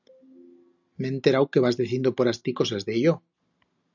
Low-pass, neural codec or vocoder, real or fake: 7.2 kHz; none; real